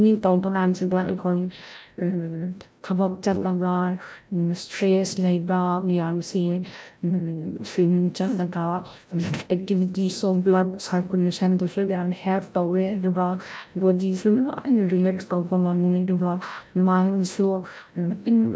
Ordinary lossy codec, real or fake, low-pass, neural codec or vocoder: none; fake; none; codec, 16 kHz, 0.5 kbps, FreqCodec, larger model